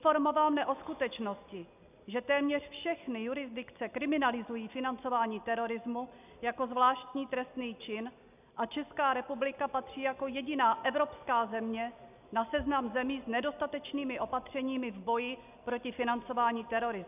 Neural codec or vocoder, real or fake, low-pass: none; real; 3.6 kHz